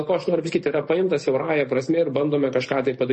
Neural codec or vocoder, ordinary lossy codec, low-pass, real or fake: none; MP3, 32 kbps; 9.9 kHz; real